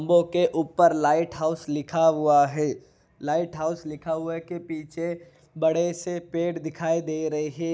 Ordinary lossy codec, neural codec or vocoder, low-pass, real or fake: none; none; none; real